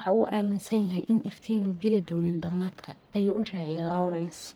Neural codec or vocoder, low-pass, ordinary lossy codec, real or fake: codec, 44.1 kHz, 1.7 kbps, Pupu-Codec; none; none; fake